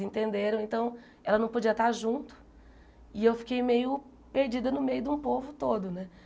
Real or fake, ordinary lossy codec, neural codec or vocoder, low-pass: real; none; none; none